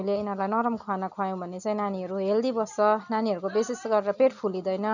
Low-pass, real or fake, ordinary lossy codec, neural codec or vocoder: 7.2 kHz; real; none; none